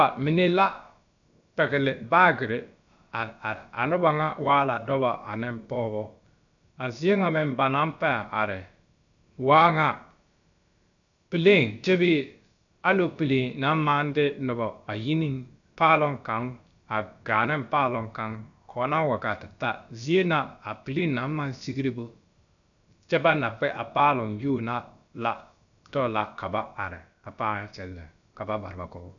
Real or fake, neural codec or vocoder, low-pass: fake; codec, 16 kHz, about 1 kbps, DyCAST, with the encoder's durations; 7.2 kHz